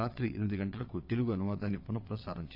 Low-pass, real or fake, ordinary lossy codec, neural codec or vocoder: 5.4 kHz; fake; AAC, 32 kbps; vocoder, 22.05 kHz, 80 mel bands, WaveNeXt